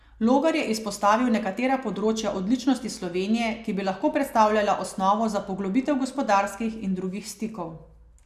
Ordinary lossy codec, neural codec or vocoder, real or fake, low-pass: Opus, 64 kbps; none; real; 14.4 kHz